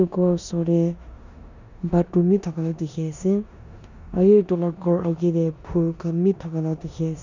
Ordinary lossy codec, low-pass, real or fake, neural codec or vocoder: none; 7.2 kHz; fake; codec, 16 kHz in and 24 kHz out, 0.9 kbps, LongCat-Audio-Codec, four codebook decoder